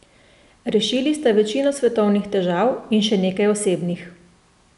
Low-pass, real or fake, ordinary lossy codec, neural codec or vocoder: 10.8 kHz; real; none; none